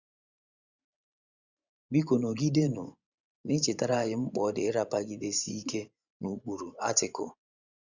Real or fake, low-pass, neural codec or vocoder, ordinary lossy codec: fake; 7.2 kHz; vocoder, 24 kHz, 100 mel bands, Vocos; Opus, 64 kbps